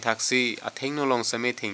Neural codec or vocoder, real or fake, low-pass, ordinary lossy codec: none; real; none; none